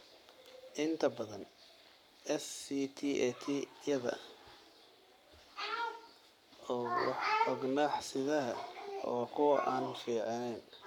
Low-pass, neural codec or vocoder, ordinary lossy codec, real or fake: 19.8 kHz; codec, 44.1 kHz, 7.8 kbps, Pupu-Codec; none; fake